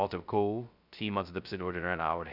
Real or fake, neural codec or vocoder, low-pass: fake; codec, 16 kHz, 0.2 kbps, FocalCodec; 5.4 kHz